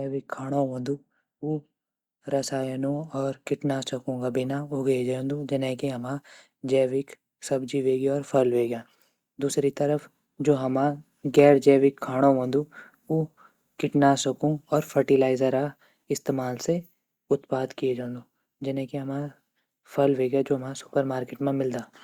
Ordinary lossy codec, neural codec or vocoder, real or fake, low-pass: Opus, 64 kbps; none; real; 19.8 kHz